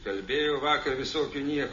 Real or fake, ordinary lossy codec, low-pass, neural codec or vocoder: real; MP3, 32 kbps; 7.2 kHz; none